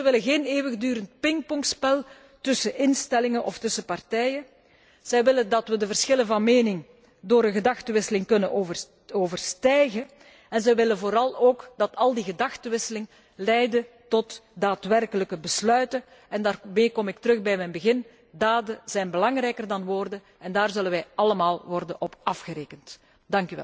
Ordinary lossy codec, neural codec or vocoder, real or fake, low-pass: none; none; real; none